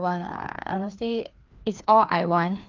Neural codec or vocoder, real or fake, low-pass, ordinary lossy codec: codec, 16 kHz, 2 kbps, FreqCodec, larger model; fake; 7.2 kHz; Opus, 24 kbps